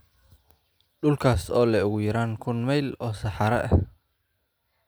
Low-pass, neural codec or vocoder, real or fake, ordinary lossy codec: none; none; real; none